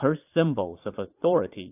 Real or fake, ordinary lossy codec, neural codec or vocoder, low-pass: fake; AAC, 24 kbps; vocoder, 22.05 kHz, 80 mel bands, Vocos; 3.6 kHz